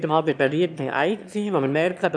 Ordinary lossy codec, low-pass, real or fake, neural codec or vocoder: none; none; fake; autoencoder, 22.05 kHz, a latent of 192 numbers a frame, VITS, trained on one speaker